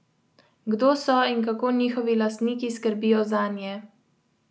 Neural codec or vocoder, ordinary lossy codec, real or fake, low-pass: none; none; real; none